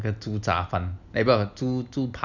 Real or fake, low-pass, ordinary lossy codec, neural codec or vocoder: real; 7.2 kHz; none; none